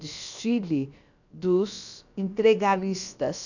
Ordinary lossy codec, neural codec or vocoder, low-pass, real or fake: none; codec, 16 kHz, about 1 kbps, DyCAST, with the encoder's durations; 7.2 kHz; fake